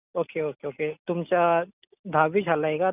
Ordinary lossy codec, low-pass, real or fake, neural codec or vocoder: none; 3.6 kHz; real; none